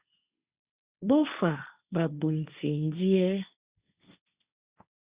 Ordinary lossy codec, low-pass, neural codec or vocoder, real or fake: Opus, 64 kbps; 3.6 kHz; codec, 16 kHz, 1.1 kbps, Voila-Tokenizer; fake